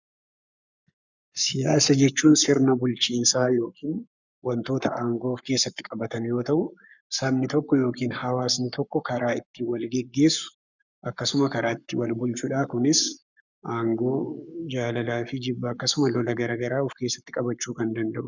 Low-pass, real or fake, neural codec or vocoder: 7.2 kHz; fake; codec, 44.1 kHz, 7.8 kbps, Pupu-Codec